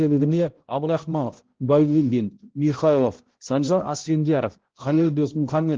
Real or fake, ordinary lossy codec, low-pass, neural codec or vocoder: fake; Opus, 16 kbps; 7.2 kHz; codec, 16 kHz, 0.5 kbps, X-Codec, HuBERT features, trained on balanced general audio